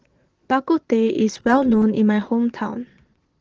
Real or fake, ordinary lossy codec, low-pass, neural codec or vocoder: fake; Opus, 16 kbps; 7.2 kHz; vocoder, 22.05 kHz, 80 mel bands, Vocos